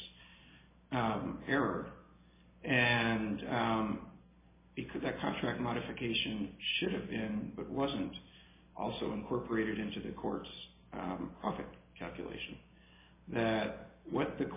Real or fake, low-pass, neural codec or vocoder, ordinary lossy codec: real; 3.6 kHz; none; MP3, 16 kbps